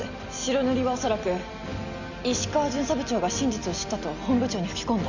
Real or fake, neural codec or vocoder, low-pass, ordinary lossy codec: real; none; 7.2 kHz; none